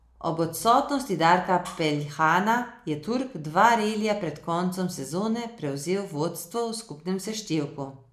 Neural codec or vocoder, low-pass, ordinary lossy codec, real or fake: none; 14.4 kHz; MP3, 96 kbps; real